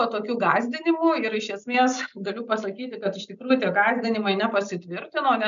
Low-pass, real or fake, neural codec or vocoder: 7.2 kHz; real; none